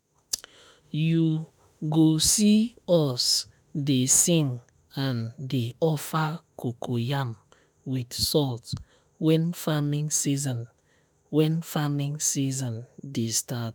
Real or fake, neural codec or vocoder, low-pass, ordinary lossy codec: fake; autoencoder, 48 kHz, 32 numbers a frame, DAC-VAE, trained on Japanese speech; none; none